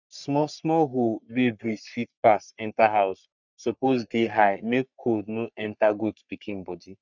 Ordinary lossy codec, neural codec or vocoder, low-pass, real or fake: none; codec, 44.1 kHz, 3.4 kbps, Pupu-Codec; 7.2 kHz; fake